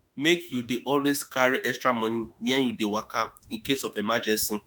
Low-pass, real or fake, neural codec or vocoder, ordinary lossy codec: none; fake; autoencoder, 48 kHz, 32 numbers a frame, DAC-VAE, trained on Japanese speech; none